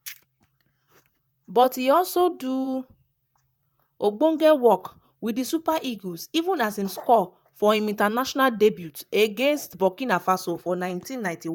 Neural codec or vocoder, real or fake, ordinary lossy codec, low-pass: vocoder, 44.1 kHz, 128 mel bands, Pupu-Vocoder; fake; none; 19.8 kHz